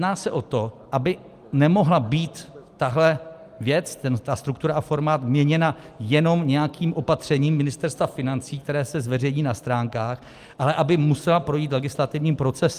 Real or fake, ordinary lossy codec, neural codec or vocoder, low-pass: real; Opus, 32 kbps; none; 14.4 kHz